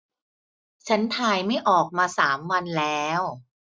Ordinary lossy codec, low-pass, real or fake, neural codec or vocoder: none; none; real; none